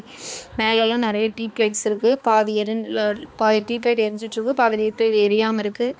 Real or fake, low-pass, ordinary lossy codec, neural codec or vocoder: fake; none; none; codec, 16 kHz, 2 kbps, X-Codec, HuBERT features, trained on balanced general audio